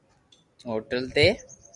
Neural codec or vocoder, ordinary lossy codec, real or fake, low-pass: none; Opus, 64 kbps; real; 10.8 kHz